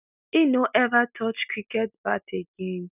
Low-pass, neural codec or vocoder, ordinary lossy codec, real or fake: 3.6 kHz; none; none; real